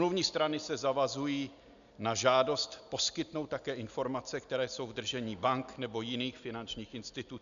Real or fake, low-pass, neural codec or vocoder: real; 7.2 kHz; none